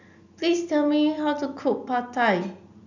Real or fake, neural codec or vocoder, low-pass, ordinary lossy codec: real; none; 7.2 kHz; none